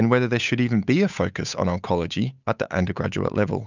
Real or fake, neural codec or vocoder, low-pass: fake; codec, 16 kHz, 8 kbps, FunCodec, trained on Chinese and English, 25 frames a second; 7.2 kHz